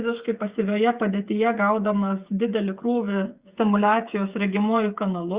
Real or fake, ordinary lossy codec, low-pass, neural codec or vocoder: fake; Opus, 64 kbps; 3.6 kHz; codec, 16 kHz, 8 kbps, FreqCodec, smaller model